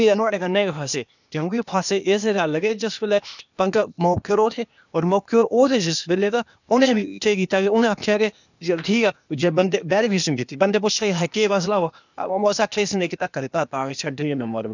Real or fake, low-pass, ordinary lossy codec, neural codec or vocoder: fake; 7.2 kHz; none; codec, 16 kHz, 0.8 kbps, ZipCodec